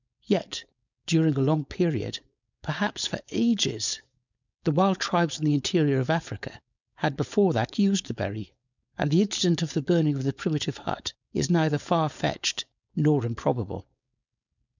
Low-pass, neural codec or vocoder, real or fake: 7.2 kHz; codec, 16 kHz, 4.8 kbps, FACodec; fake